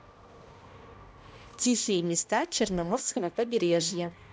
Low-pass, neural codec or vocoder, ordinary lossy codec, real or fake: none; codec, 16 kHz, 1 kbps, X-Codec, HuBERT features, trained on balanced general audio; none; fake